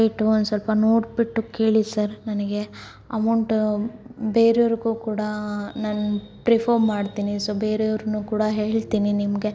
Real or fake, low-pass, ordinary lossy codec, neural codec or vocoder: real; none; none; none